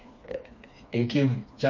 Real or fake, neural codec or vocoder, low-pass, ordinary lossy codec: fake; codec, 16 kHz, 2 kbps, FreqCodec, smaller model; 7.2 kHz; MP3, 48 kbps